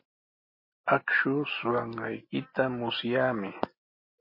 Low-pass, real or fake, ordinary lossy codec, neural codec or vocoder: 5.4 kHz; real; MP3, 24 kbps; none